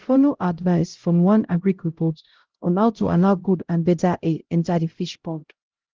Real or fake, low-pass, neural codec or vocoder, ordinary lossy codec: fake; 7.2 kHz; codec, 16 kHz, 0.5 kbps, X-Codec, HuBERT features, trained on LibriSpeech; Opus, 16 kbps